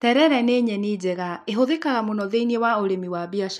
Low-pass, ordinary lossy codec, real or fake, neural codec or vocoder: 14.4 kHz; none; real; none